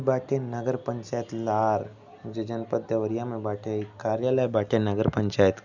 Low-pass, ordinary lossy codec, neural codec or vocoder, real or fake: 7.2 kHz; none; none; real